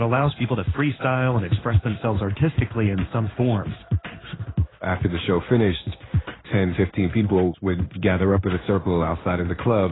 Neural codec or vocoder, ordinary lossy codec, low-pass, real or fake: codec, 16 kHz in and 24 kHz out, 1 kbps, XY-Tokenizer; AAC, 16 kbps; 7.2 kHz; fake